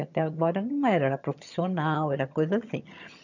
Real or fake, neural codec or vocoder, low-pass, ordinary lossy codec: fake; vocoder, 22.05 kHz, 80 mel bands, HiFi-GAN; 7.2 kHz; none